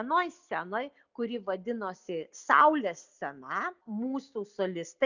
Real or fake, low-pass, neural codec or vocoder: real; 7.2 kHz; none